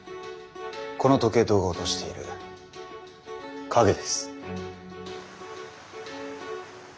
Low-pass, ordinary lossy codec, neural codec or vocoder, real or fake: none; none; none; real